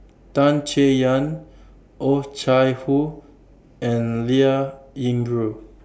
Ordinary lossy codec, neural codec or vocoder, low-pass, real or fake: none; none; none; real